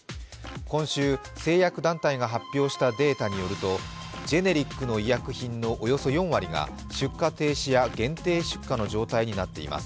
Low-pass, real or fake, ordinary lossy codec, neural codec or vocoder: none; real; none; none